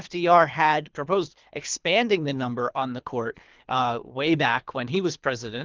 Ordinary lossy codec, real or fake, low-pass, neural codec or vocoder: Opus, 32 kbps; fake; 7.2 kHz; codec, 24 kHz, 3 kbps, HILCodec